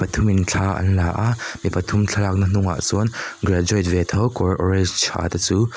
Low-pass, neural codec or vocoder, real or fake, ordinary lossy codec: none; none; real; none